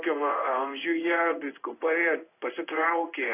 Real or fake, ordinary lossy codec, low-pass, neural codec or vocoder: fake; AAC, 24 kbps; 3.6 kHz; codec, 16 kHz in and 24 kHz out, 1 kbps, XY-Tokenizer